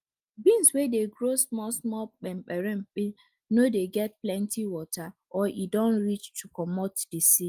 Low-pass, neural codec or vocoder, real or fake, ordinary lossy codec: 14.4 kHz; none; real; Opus, 32 kbps